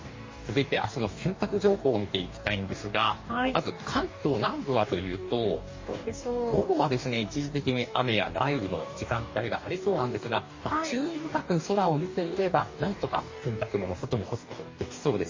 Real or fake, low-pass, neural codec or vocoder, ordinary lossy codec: fake; 7.2 kHz; codec, 44.1 kHz, 2.6 kbps, DAC; MP3, 32 kbps